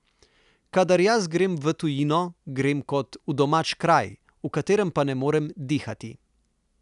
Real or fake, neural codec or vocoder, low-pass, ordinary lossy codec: real; none; 10.8 kHz; none